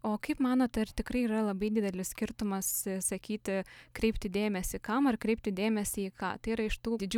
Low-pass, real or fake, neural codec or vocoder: 19.8 kHz; real; none